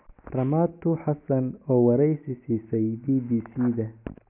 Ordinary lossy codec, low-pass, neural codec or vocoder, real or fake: none; 3.6 kHz; none; real